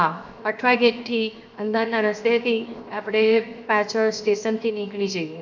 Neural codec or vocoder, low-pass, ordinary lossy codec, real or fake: codec, 16 kHz, 0.7 kbps, FocalCodec; 7.2 kHz; none; fake